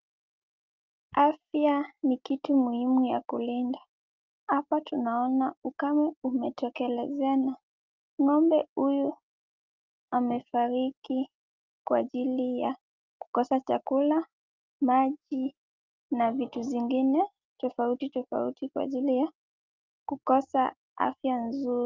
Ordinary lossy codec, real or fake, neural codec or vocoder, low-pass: Opus, 24 kbps; real; none; 7.2 kHz